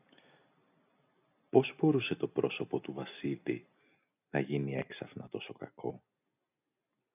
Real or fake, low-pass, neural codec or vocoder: real; 3.6 kHz; none